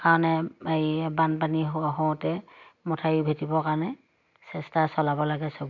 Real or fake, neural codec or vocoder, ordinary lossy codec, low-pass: real; none; none; 7.2 kHz